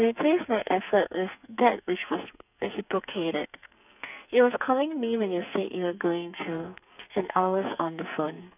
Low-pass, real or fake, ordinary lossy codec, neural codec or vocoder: 3.6 kHz; fake; none; codec, 44.1 kHz, 2.6 kbps, SNAC